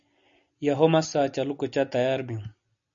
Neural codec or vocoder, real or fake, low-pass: none; real; 7.2 kHz